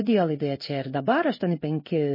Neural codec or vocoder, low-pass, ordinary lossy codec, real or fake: codec, 16 kHz in and 24 kHz out, 1 kbps, XY-Tokenizer; 5.4 kHz; MP3, 24 kbps; fake